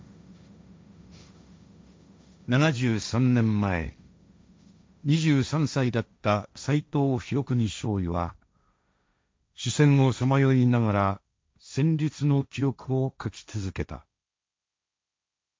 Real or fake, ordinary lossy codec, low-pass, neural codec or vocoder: fake; none; none; codec, 16 kHz, 1.1 kbps, Voila-Tokenizer